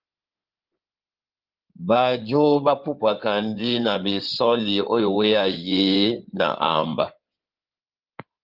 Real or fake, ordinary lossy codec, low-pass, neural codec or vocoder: fake; Opus, 24 kbps; 5.4 kHz; codec, 16 kHz in and 24 kHz out, 2.2 kbps, FireRedTTS-2 codec